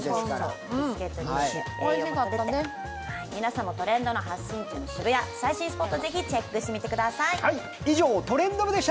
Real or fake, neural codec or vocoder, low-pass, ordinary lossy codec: real; none; none; none